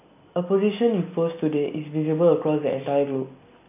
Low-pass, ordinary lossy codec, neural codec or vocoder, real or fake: 3.6 kHz; none; none; real